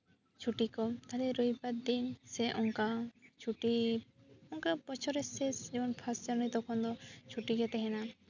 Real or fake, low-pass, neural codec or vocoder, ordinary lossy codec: real; 7.2 kHz; none; none